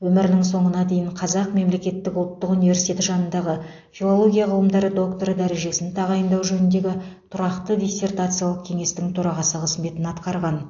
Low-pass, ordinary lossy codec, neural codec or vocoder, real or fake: 7.2 kHz; AAC, 48 kbps; none; real